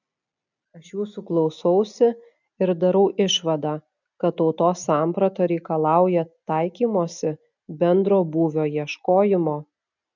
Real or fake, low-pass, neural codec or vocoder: real; 7.2 kHz; none